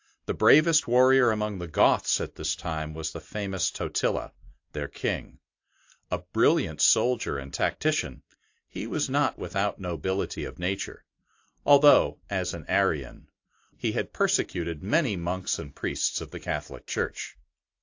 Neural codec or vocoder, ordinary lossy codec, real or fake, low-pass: none; AAC, 48 kbps; real; 7.2 kHz